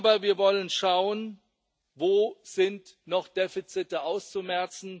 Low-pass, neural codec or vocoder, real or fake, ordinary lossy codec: none; none; real; none